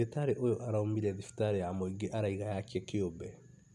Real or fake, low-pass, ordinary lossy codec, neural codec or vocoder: real; none; none; none